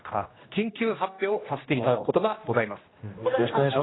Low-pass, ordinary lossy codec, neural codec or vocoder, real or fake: 7.2 kHz; AAC, 16 kbps; codec, 16 kHz, 1 kbps, X-Codec, HuBERT features, trained on general audio; fake